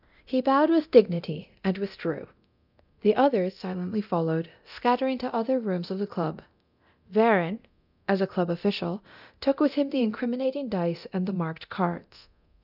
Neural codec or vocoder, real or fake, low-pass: codec, 24 kHz, 0.9 kbps, DualCodec; fake; 5.4 kHz